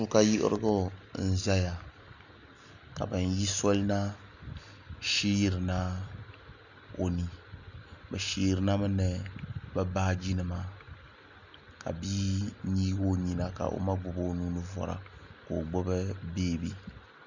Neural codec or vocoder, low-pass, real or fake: none; 7.2 kHz; real